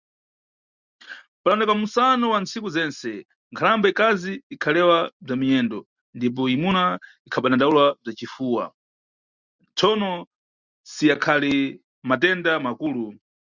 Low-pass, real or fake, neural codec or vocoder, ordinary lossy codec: 7.2 kHz; real; none; Opus, 64 kbps